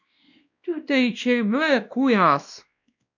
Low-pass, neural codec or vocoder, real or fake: 7.2 kHz; codec, 16 kHz, 1 kbps, X-Codec, WavLM features, trained on Multilingual LibriSpeech; fake